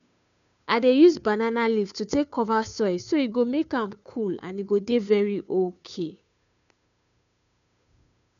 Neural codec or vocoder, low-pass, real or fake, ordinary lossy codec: codec, 16 kHz, 2 kbps, FunCodec, trained on Chinese and English, 25 frames a second; 7.2 kHz; fake; none